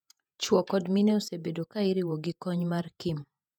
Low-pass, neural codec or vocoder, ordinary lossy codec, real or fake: 19.8 kHz; vocoder, 48 kHz, 128 mel bands, Vocos; none; fake